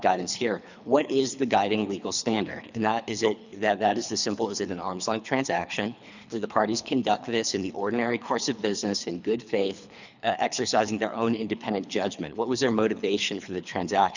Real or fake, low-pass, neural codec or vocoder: fake; 7.2 kHz; codec, 24 kHz, 3 kbps, HILCodec